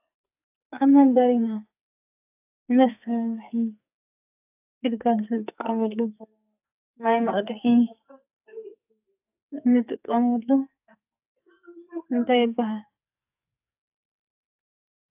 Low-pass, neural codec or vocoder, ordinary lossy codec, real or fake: 3.6 kHz; codec, 44.1 kHz, 2.6 kbps, SNAC; AAC, 32 kbps; fake